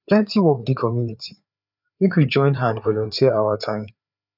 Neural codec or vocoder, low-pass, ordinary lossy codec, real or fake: codec, 16 kHz, 4 kbps, FreqCodec, larger model; 5.4 kHz; none; fake